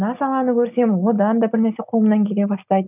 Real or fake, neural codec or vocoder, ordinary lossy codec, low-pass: real; none; none; 3.6 kHz